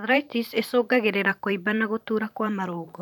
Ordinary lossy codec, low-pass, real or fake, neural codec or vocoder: none; none; fake; vocoder, 44.1 kHz, 128 mel bands every 512 samples, BigVGAN v2